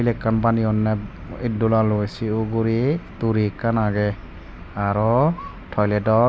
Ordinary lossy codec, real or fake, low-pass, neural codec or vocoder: none; real; none; none